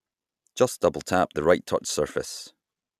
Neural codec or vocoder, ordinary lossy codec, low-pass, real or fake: none; none; 14.4 kHz; real